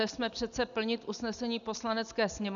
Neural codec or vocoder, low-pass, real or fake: none; 7.2 kHz; real